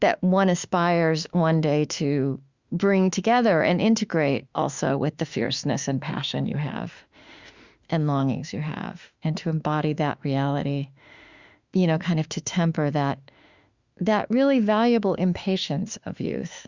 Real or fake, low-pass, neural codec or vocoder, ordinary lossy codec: fake; 7.2 kHz; autoencoder, 48 kHz, 32 numbers a frame, DAC-VAE, trained on Japanese speech; Opus, 64 kbps